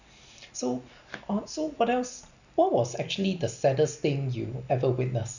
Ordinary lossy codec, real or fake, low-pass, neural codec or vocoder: none; real; 7.2 kHz; none